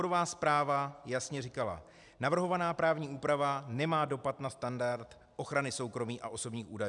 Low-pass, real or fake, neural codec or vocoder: 10.8 kHz; real; none